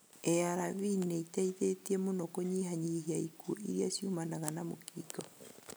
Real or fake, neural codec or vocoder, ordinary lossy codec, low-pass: real; none; none; none